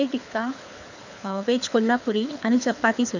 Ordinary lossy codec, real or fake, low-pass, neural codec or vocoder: none; fake; 7.2 kHz; codec, 24 kHz, 6 kbps, HILCodec